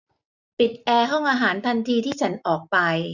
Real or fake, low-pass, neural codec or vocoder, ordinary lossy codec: real; 7.2 kHz; none; none